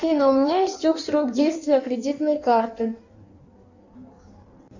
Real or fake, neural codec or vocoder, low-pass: fake; codec, 16 kHz in and 24 kHz out, 1.1 kbps, FireRedTTS-2 codec; 7.2 kHz